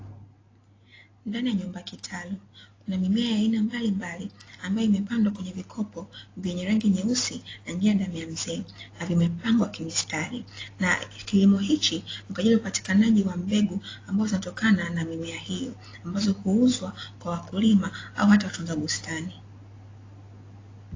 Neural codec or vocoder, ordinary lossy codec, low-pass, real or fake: none; AAC, 32 kbps; 7.2 kHz; real